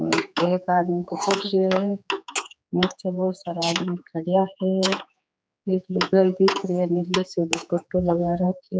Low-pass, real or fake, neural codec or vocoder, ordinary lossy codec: none; fake; codec, 16 kHz, 4 kbps, X-Codec, HuBERT features, trained on general audio; none